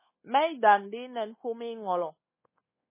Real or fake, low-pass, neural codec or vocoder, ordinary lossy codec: fake; 3.6 kHz; codec, 24 kHz, 1.2 kbps, DualCodec; MP3, 16 kbps